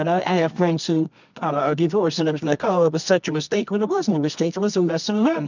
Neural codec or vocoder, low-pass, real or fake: codec, 24 kHz, 0.9 kbps, WavTokenizer, medium music audio release; 7.2 kHz; fake